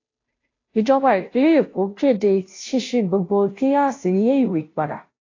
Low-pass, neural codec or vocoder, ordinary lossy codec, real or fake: 7.2 kHz; codec, 16 kHz, 0.5 kbps, FunCodec, trained on Chinese and English, 25 frames a second; AAC, 32 kbps; fake